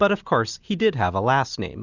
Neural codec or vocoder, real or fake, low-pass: none; real; 7.2 kHz